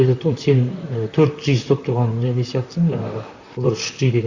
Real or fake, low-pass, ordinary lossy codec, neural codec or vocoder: fake; 7.2 kHz; Opus, 64 kbps; vocoder, 44.1 kHz, 128 mel bands, Pupu-Vocoder